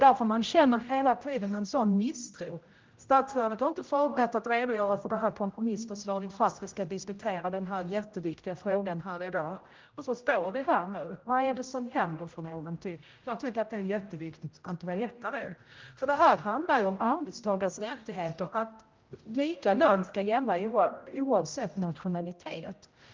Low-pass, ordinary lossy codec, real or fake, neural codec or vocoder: 7.2 kHz; Opus, 32 kbps; fake; codec, 16 kHz, 0.5 kbps, X-Codec, HuBERT features, trained on general audio